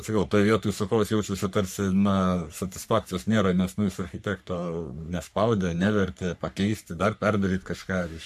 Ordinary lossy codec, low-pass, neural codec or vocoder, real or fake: AAC, 96 kbps; 14.4 kHz; codec, 44.1 kHz, 3.4 kbps, Pupu-Codec; fake